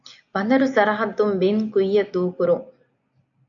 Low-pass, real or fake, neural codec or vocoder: 7.2 kHz; real; none